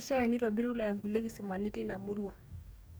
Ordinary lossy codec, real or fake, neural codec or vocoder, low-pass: none; fake; codec, 44.1 kHz, 2.6 kbps, DAC; none